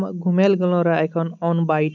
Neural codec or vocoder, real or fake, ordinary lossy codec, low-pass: none; real; none; 7.2 kHz